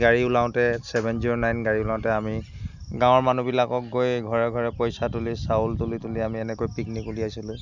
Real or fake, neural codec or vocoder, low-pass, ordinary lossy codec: real; none; 7.2 kHz; none